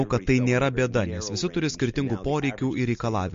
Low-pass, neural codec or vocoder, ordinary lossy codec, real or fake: 7.2 kHz; none; MP3, 48 kbps; real